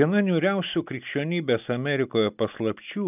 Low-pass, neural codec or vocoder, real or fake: 3.6 kHz; none; real